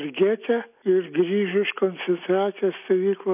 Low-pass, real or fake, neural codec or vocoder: 3.6 kHz; real; none